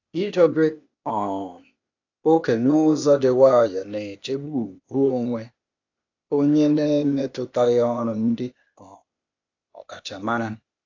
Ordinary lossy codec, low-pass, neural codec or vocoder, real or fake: AAC, 48 kbps; 7.2 kHz; codec, 16 kHz, 0.8 kbps, ZipCodec; fake